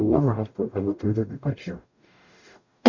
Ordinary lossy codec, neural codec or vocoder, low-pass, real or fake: none; codec, 44.1 kHz, 0.9 kbps, DAC; 7.2 kHz; fake